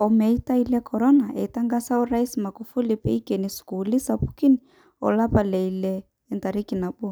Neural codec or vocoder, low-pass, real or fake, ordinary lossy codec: none; none; real; none